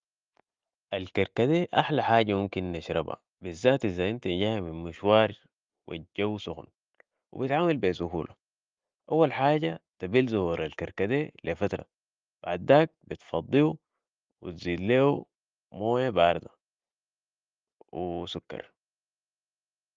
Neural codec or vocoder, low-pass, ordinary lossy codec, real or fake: none; 7.2 kHz; Opus, 32 kbps; real